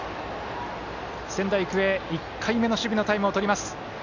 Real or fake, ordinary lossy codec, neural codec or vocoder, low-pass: real; none; none; 7.2 kHz